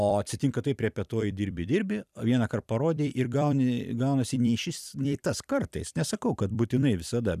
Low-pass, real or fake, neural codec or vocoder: 14.4 kHz; fake; vocoder, 44.1 kHz, 128 mel bands every 256 samples, BigVGAN v2